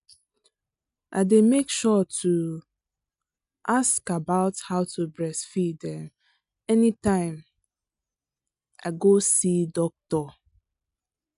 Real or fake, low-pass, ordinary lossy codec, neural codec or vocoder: real; 10.8 kHz; none; none